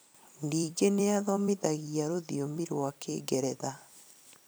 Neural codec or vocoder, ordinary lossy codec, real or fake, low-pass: vocoder, 44.1 kHz, 128 mel bands every 256 samples, BigVGAN v2; none; fake; none